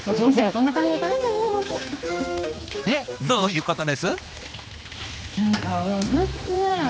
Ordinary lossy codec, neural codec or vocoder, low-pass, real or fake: none; codec, 16 kHz, 2 kbps, X-Codec, HuBERT features, trained on general audio; none; fake